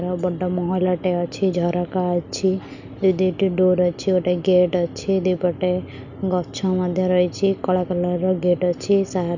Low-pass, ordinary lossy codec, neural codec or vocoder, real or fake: 7.2 kHz; none; none; real